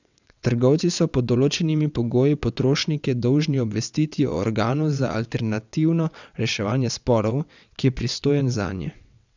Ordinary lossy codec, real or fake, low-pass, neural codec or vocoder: none; fake; 7.2 kHz; vocoder, 22.05 kHz, 80 mel bands, WaveNeXt